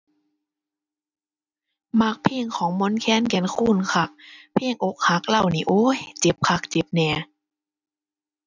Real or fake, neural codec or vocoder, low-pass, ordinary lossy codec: real; none; 7.2 kHz; none